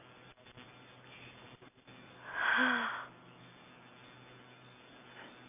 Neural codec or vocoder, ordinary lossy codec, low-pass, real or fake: none; none; 3.6 kHz; real